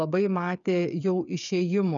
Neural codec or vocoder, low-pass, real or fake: codec, 16 kHz, 8 kbps, FreqCodec, smaller model; 7.2 kHz; fake